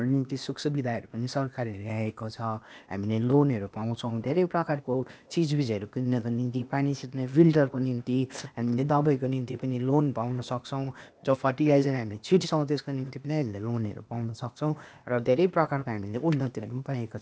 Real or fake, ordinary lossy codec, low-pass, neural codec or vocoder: fake; none; none; codec, 16 kHz, 0.8 kbps, ZipCodec